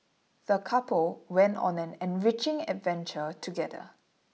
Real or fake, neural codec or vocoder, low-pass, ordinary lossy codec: real; none; none; none